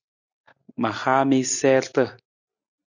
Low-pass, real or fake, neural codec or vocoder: 7.2 kHz; real; none